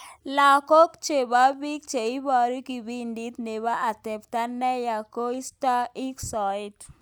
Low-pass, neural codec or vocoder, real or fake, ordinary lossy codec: none; none; real; none